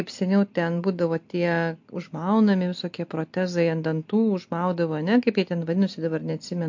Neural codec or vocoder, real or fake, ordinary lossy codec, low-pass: none; real; MP3, 48 kbps; 7.2 kHz